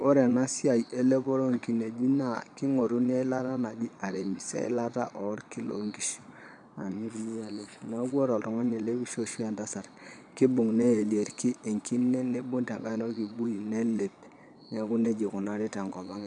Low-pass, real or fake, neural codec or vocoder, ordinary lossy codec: 9.9 kHz; fake; vocoder, 22.05 kHz, 80 mel bands, Vocos; none